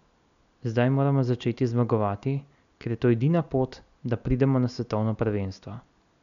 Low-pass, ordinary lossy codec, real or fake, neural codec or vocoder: 7.2 kHz; none; real; none